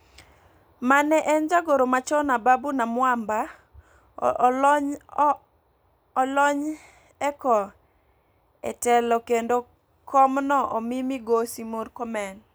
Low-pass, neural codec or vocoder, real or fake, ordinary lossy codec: none; none; real; none